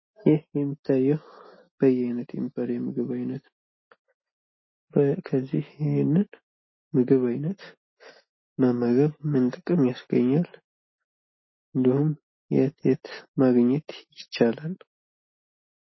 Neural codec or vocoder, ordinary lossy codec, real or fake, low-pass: none; MP3, 24 kbps; real; 7.2 kHz